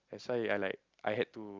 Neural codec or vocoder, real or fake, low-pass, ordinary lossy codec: none; real; 7.2 kHz; Opus, 24 kbps